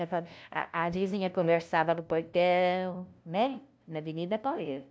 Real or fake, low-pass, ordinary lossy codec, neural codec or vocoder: fake; none; none; codec, 16 kHz, 0.5 kbps, FunCodec, trained on LibriTTS, 25 frames a second